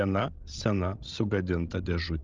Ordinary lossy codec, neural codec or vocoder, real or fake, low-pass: Opus, 32 kbps; codec, 16 kHz, 16 kbps, FreqCodec, larger model; fake; 7.2 kHz